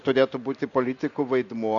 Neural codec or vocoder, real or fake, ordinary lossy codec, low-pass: none; real; MP3, 64 kbps; 7.2 kHz